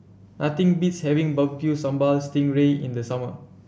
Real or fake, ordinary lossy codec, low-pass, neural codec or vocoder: real; none; none; none